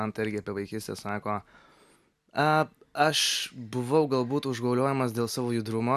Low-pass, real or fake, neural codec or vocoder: 14.4 kHz; real; none